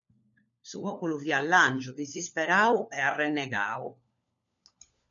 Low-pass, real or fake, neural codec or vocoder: 7.2 kHz; fake; codec, 16 kHz, 4 kbps, FunCodec, trained on LibriTTS, 50 frames a second